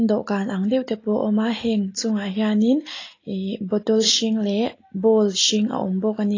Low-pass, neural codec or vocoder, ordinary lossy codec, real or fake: 7.2 kHz; none; AAC, 32 kbps; real